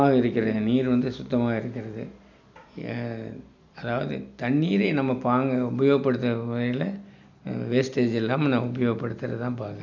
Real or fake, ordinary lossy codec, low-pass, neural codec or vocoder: real; none; 7.2 kHz; none